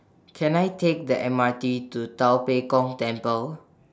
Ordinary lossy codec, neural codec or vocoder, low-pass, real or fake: none; none; none; real